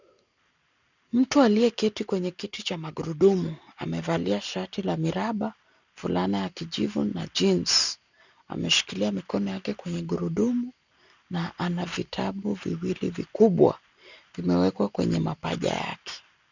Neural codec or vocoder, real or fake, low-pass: none; real; 7.2 kHz